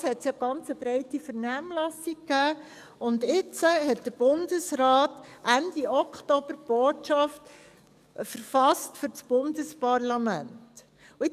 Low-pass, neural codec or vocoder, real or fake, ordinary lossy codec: 14.4 kHz; codec, 44.1 kHz, 7.8 kbps, DAC; fake; none